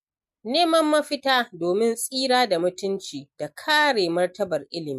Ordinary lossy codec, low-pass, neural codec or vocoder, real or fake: MP3, 64 kbps; 14.4 kHz; none; real